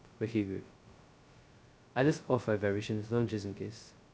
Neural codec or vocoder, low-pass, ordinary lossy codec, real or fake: codec, 16 kHz, 0.2 kbps, FocalCodec; none; none; fake